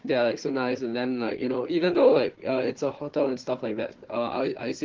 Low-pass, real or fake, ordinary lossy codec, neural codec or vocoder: 7.2 kHz; fake; Opus, 16 kbps; codec, 16 kHz, 4 kbps, FunCodec, trained on Chinese and English, 50 frames a second